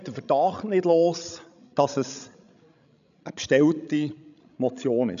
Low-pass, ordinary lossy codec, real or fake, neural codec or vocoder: 7.2 kHz; none; fake; codec, 16 kHz, 16 kbps, FreqCodec, larger model